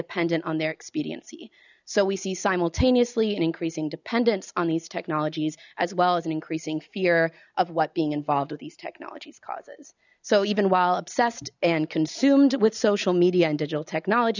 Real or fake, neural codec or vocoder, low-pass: real; none; 7.2 kHz